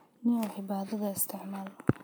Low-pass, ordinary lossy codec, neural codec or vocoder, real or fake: none; none; none; real